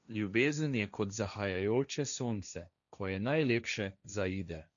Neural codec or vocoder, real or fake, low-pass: codec, 16 kHz, 1.1 kbps, Voila-Tokenizer; fake; 7.2 kHz